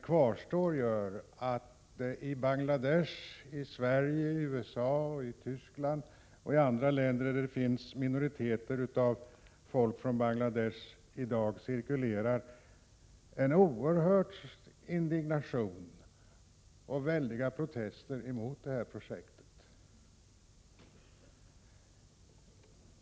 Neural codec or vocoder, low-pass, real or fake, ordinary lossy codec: none; none; real; none